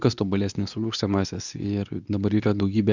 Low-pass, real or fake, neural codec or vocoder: 7.2 kHz; fake; codec, 24 kHz, 0.9 kbps, WavTokenizer, medium speech release version 2